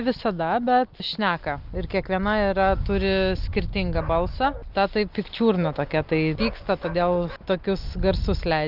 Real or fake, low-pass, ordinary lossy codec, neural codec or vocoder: real; 5.4 kHz; Opus, 32 kbps; none